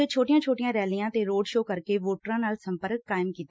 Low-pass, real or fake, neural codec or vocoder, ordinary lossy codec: none; real; none; none